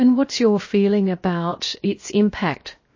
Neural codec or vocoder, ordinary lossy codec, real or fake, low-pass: codec, 16 kHz, 0.7 kbps, FocalCodec; MP3, 32 kbps; fake; 7.2 kHz